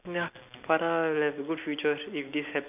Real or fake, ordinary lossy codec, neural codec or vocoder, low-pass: real; none; none; 3.6 kHz